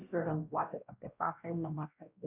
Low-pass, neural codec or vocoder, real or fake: 3.6 kHz; codec, 16 kHz, 1 kbps, X-Codec, WavLM features, trained on Multilingual LibriSpeech; fake